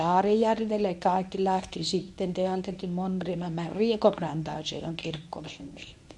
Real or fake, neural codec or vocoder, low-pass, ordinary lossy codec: fake; codec, 24 kHz, 0.9 kbps, WavTokenizer, medium speech release version 1; none; none